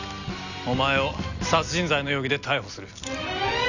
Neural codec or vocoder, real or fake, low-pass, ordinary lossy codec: none; real; 7.2 kHz; none